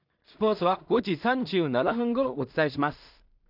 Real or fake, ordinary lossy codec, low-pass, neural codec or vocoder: fake; none; 5.4 kHz; codec, 16 kHz in and 24 kHz out, 0.4 kbps, LongCat-Audio-Codec, two codebook decoder